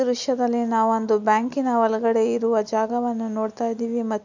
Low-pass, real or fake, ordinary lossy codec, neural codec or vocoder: 7.2 kHz; real; none; none